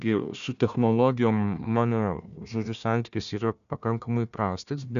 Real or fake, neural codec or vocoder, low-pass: fake; codec, 16 kHz, 1 kbps, FunCodec, trained on Chinese and English, 50 frames a second; 7.2 kHz